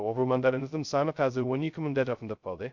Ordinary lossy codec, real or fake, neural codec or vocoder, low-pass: Opus, 64 kbps; fake; codec, 16 kHz, 0.2 kbps, FocalCodec; 7.2 kHz